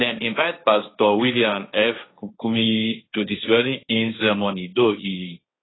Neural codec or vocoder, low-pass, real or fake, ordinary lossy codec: codec, 16 kHz, 1.1 kbps, Voila-Tokenizer; 7.2 kHz; fake; AAC, 16 kbps